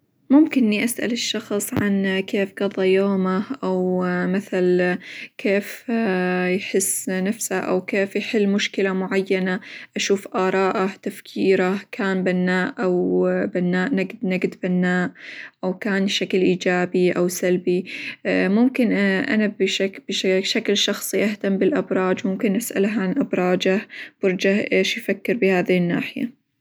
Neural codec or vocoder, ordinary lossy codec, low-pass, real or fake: none; none; none; real